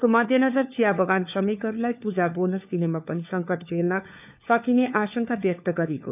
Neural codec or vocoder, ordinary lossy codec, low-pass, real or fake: codec, 16 kHz, 4 kbps, FunCodec, trained on LibriTTS, 50 frames a second; none; 3.6 kHz; fake